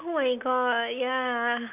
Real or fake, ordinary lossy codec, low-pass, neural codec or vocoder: real; none; 3.6 kHz; none